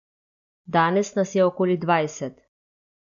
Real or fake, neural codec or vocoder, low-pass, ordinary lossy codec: real; none; 7.2 kHz; MP3, 96 kbps